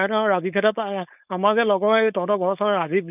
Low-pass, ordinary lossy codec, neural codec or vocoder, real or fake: 3.6 kHz; none; codec, 16 kHz, 4.8 kbps, FACodec; fake